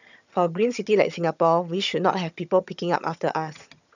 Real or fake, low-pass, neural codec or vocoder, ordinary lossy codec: fake; 7.2 kHz; vocoder, 22.05 kHz, 80 mel bands, HiFi-GAN; none